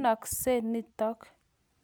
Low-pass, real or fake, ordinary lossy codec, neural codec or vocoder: none; real; none; none